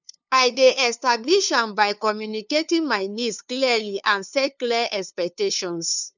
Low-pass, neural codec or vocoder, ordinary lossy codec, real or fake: 7.2 kHz; codec, 16 kHz, 2 kbps, FunCodec, trained on LibriTTS, 25 frames a second; none; fake